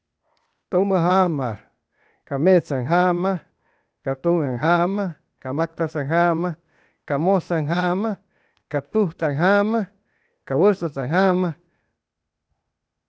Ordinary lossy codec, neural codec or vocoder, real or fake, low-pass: none; codec, 16 kHz, 0.8 kbps, ZipCodec; fake; none